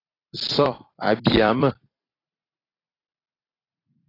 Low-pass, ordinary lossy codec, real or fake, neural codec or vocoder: 5.4 kHz; AAC, 24 kbps; fake; vocoder, 44.1 kHz, 128 mel bands every 256 samples, BigVGAN v2